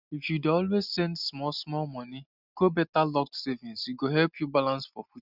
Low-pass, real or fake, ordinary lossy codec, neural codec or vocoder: 5.4 kHz; real; none; none